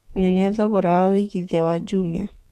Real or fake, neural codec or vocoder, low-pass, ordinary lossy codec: fake; codec, 32 kHz, 1.9 kbps, SNAC; 14.4 kHz; none